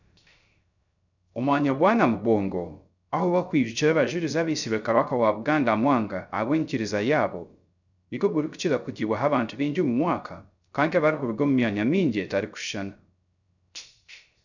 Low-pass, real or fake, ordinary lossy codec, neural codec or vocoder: 7.2 kHz; fake; none; codec, 16 kHz, 0.3 kbps, FocalCodec